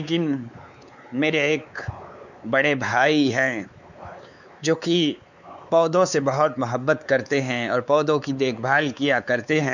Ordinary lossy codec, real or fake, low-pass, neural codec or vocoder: none; fake; 7.2 kHz; codec, 16 kHz, 4 kbps, X-Codec, WavLM features, trained on Multilingual LibriSpeech